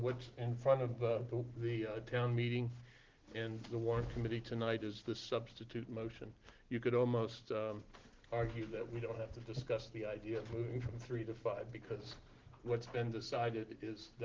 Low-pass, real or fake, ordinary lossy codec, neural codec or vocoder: 7.2 kHz; real; Opus, 16 kbps; none